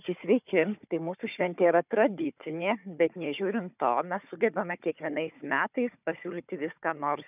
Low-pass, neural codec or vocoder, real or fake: 3.6 kHz; codec, 16 kHz, 4 kbps, FunCodec, trained on Chinese and English, 50 frames a second; fake